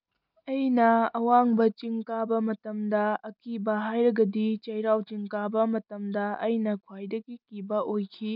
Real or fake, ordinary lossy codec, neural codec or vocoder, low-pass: real; none; none; 5.4 kHz